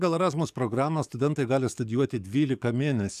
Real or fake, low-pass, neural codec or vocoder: fake; 14.4 kHz; codec, 44.1 kHz, 7.8 kbps, DAC